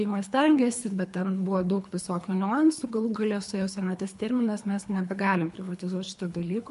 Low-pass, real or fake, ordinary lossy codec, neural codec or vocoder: 10.8 kHz; fake; MP3, 64 kbps; codec, 24 kHz, 3 kbps, HILCodec